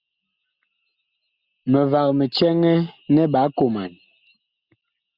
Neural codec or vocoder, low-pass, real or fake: none; 5.4 kHz; real